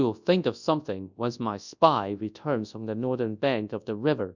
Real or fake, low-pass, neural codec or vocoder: fake; 7.2 kHz; codec, 24 kHz, 0.9 kbps, WavTokenizer, large speech release